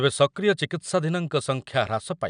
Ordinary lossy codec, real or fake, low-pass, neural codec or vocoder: none; real; 9.9 kHz; none